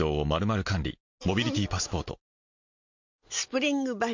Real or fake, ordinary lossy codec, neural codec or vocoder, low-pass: real; MP3, 48 kbps; none; 7.2 kHz